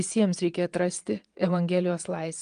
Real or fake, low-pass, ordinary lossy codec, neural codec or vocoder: fake; 9.9 kHz; Opus, 32 kbps; vocoder, 22.05 kHz, 80 mel bands, WaveNeXt